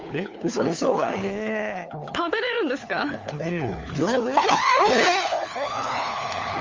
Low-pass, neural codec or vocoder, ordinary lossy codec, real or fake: 7.2 kHz; codec, 16 kHz, 4 kbps, FunCodec, trained on LibriTTS, 50 frames a second; Opus, 32 kbps; fake